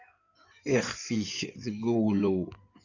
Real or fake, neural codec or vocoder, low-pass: fake; codec, 16 kHz in and 24 kHz out, 2.2 kbps, FireRedTTS-2 codec; 7.2 kHz